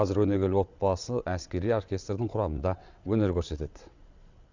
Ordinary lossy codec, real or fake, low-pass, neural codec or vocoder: Opus, 64 kbps; fake; 7.2 kHz; vocoder, 22.05 kHz, 80 mel bands, WaveNeXt